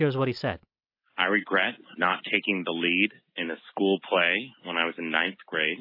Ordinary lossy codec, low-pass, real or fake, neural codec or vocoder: AAC, 48 kbps; 5.4 kHz; real; none